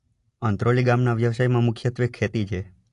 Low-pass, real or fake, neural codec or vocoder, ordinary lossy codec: 10.8 kHz; real; none; AAC, 48 kbps